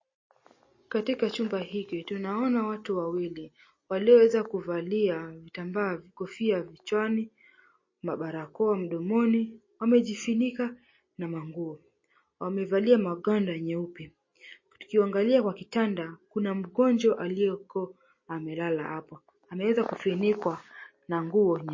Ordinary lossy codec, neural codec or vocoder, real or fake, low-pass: MP3, 32 kbps; none; real; 7.2 kHz